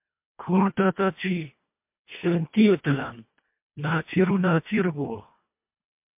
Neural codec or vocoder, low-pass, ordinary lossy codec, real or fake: codec, 24 kHz, 1.5 kbps, HILCodec; 3.6 kHz; MP3, 32 kbps; fake